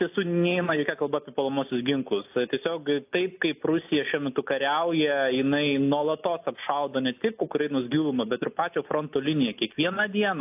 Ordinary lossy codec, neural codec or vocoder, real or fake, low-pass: AAC, 32 kbps; none; real; 3.6 kHz